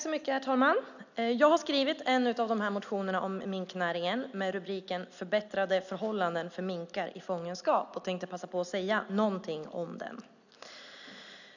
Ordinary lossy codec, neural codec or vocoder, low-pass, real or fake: none; none; 7.2 kHz; real